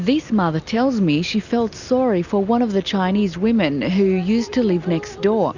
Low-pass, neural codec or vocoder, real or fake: 7.2 kHz; none; real